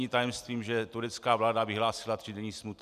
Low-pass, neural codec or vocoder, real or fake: 14.4 kHz; none; real